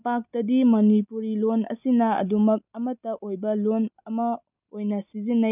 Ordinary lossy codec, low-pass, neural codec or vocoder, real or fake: none; 3.6 kHz; none; real